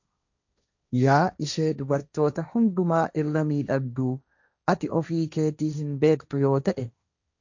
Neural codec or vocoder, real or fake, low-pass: codec, 16 kHz, 1.1 kbps, Voila-Tokenizer; fake; 7.2 kHz